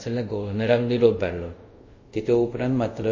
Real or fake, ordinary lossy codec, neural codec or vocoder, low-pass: fake; MP3, 32 kbps; codec, 24 kHz, 0.5 kbps, DualCodec; 7.2 kHz